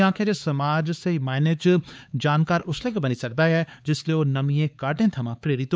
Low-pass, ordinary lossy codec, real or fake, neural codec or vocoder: none; none; fake; codec, 16 kHz, 4 kbps, X-Codec, HuBERT features, trained on LibriSpeech